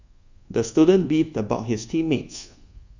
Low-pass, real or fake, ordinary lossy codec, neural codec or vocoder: 7.2 kHz; fake; Opus, 64 kbps; codec, 24 kHz, 1.2 kbps, DualCodec